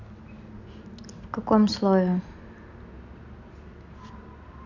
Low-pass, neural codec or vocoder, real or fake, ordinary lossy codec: 7.2 kHz; none; real; none